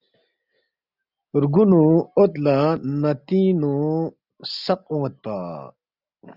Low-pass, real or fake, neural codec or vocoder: 5.4 kHz; real; none